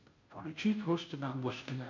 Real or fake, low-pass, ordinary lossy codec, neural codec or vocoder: fake; 7.2 kHz; MP3, 48 kbps; codec, 16 kHz, 0.5 kbps, FunCodec, trained on Chinese and English, 25 frames a second